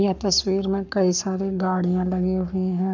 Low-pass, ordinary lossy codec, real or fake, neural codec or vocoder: 7.2 kHz; none; fake; codec, 44.1 kHz, 7.8 kbps, Pupu-Codec